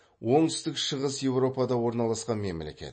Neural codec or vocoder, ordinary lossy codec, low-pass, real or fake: none; MP3, 32 kbps; 9.9 kHz; real